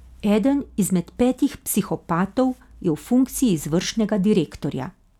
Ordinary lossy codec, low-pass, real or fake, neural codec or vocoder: none; 19.8 kHz; real; none